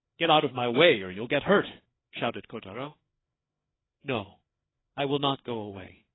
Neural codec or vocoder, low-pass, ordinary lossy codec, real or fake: codec, 16 kHz, 4 kbps, FreqCodec, larger model; 7.2 kHz; AAC, 16 kbps; fake